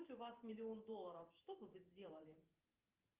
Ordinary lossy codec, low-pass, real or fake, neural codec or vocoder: Opus, 24 kbps; 3.6 kHz; fake; vocoder, 44.1 kHz, 128 mel bands every 512 samples, BigVGAN v2